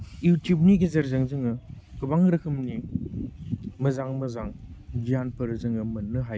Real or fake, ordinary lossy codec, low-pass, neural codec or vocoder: real; none; none; none